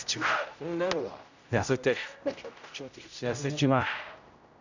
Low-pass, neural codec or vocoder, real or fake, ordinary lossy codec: 7.2 kHz; codec, 16 kHz, 0.5 kbps, X-Codec, HuBERT features, trained on general audio; fake; none